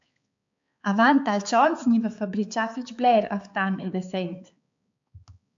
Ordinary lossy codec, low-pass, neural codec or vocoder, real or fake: MP3, 64 kbps; 7.2 kHz; codec, 16 kHz, 4 kbps, X-Codec, HuBERT features, trained on balanced general audio; fake